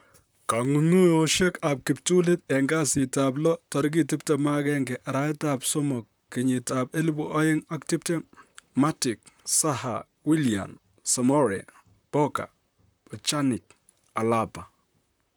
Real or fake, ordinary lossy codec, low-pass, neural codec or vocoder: fake; none; none; vocoder, 44.1 kHz, 128 mel bands, Pupu-Vocoder